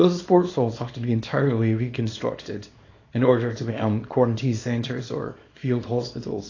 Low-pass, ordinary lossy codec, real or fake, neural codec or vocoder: 7.2 kHz; AAC, 32 kbps; fake; codec, 24 kHz, 0.9 kbps, WavTokenizer, small release